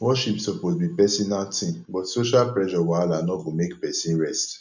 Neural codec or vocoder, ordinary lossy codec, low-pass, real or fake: none; none; 7.2 kHz; real